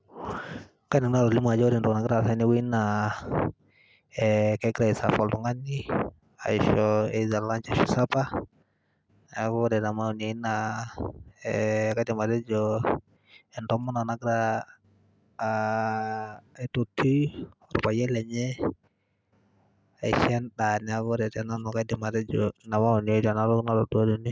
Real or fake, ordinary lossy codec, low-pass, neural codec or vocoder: real; none; none; none